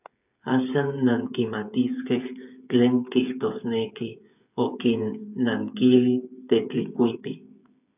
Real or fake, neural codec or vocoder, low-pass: fake; codec, 16 kHz, 8 kbps, FreqCodec, smaller model; 3.6 kHz